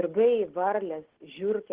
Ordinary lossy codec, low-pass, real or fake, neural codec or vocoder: Opus, 16 kbps; 3.6 kHz; real; none